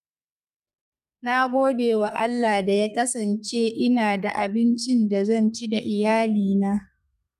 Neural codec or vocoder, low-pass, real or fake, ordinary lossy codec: codec, 32 kHz, 1.9 kbps, SNAC; 14.4 kHz; fake; none